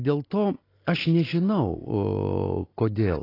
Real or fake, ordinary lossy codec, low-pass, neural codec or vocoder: real; AAC, 24 kbps; 5.4 kHz; none